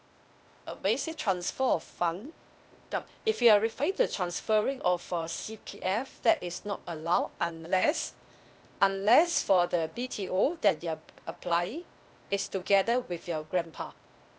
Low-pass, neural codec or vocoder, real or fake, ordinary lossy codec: none; codec, 16 kHz, 0.8 kbps, ZipCodec; fake; none